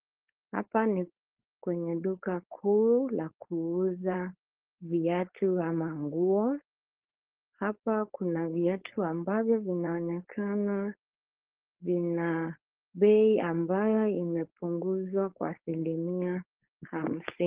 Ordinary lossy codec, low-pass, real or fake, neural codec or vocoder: Opus, 16 kbps; 3.6 kHz; fake; codec, 16 kHz, 4.8 kbps, FACodec